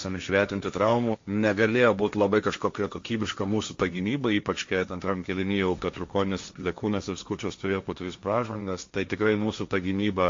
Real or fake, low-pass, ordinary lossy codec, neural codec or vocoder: fake; 7.2 kHz; MP3, 48 kbps; codec, 16 kHz, 1.1 kbps, Voila-Tokenizer